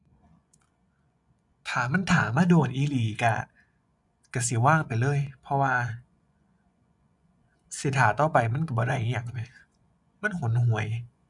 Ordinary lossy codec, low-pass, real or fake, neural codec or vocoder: none; 10.8 kHz; fake; vocoder, 24 kHz, 100 mel bands, Vocos